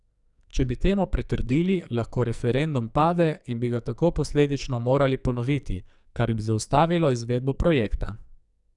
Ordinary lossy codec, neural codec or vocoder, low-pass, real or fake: none; codec, 44.1 kHz, 2.6 kbps, SNAC; 10.8 kHz; fake